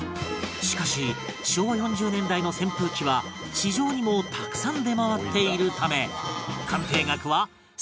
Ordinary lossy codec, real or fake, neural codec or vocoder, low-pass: none; real; none; none